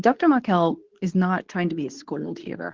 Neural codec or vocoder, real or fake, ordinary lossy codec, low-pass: codec, 24 kHz, 0.9 kbps, WavTokenizer, medium speech release version 2; fake; Opus, 16 kbps; 7.2 kHz